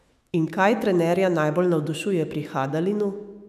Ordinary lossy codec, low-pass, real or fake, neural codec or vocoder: none; 14.4 kHz; fake; autoencoder, 48 kHz, 128 numbers a frame, DAC-VAE, trained on Japanese speech